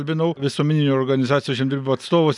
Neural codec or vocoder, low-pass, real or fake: none; 10.8 kHz; real